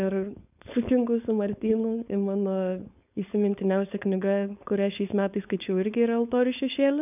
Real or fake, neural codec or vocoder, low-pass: fake; codec, 16 kHz, 4.8 kbps, FACodec; 3.6 kHz